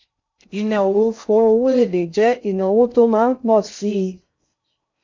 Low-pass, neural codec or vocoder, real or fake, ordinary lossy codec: 7.2 kHz; codec, 16 kHz in and 24 kHz out, 0.6 kbps, FocalCodec, streaming, 2048 codes; fake; MP3, 48 kbps